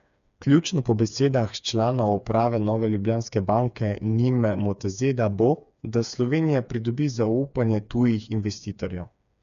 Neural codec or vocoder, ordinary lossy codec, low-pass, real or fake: codec, 16 kHz, 4 kbps, FreqCodec, smaller model; none; 7.2 kHz; fake